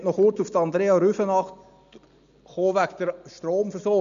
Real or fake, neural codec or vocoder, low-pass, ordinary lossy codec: real; none; 7.2 kHz; none